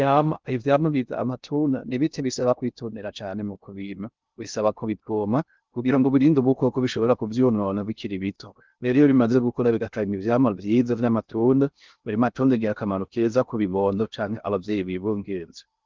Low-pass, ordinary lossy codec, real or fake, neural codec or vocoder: 7.2 kHz; Opus, 32 kbps; fake; codec, 16 kHz in and 24 kHz out, 0.6 kbps, FocalCodec, streaming, 2048 codes